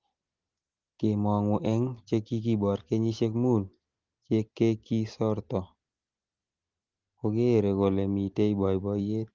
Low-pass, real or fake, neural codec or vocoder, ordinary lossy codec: 7.2 kHz; real; none; Opus, 16 kbps